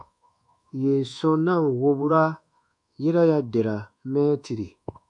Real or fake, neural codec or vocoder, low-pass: fake; codec, 24 kHz, 1.2 kbps, DualCodec; 10.8 kHz